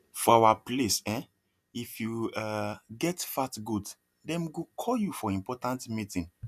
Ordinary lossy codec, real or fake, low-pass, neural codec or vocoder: none; real; 14.4 kHz; none